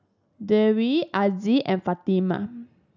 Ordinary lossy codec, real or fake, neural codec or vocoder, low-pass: none; real; none; 7.2 kHz